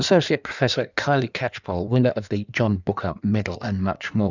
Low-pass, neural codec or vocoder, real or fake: 7.2 kHz; codec, 16 kHz in and 24 kHz out, 1.1 kbps, FireRedTTS-2 codec; fake